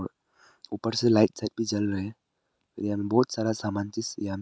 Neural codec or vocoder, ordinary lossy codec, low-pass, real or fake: none; none; none; real